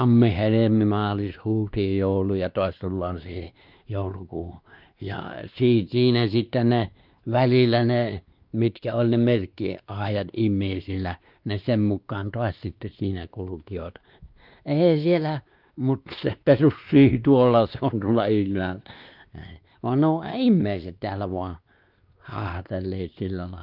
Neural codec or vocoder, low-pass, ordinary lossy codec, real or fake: codec, 16 kHz, 2 kbps, X-Codec, WavLM features, trained on Multilingual LibriSpeech; 5.4 kHz; Opus, 24 kbps; fake